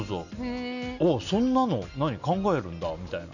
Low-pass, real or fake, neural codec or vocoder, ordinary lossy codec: 7.2 kHz; real; none; none